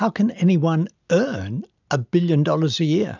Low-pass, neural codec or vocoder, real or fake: 7.2 kHz; none; real